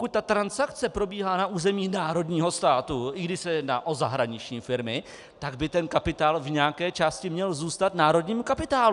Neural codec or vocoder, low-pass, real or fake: none; 10.8 kHz; real